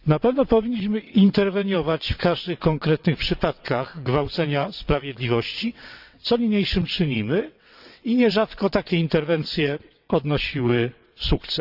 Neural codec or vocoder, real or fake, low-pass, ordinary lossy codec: vocoder, 22.05 kHz, 80 mel bands, WaveNeXt; fake; 5.4 kHz; none